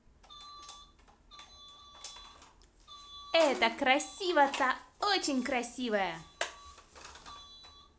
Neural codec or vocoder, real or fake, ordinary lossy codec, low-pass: none; real; none; none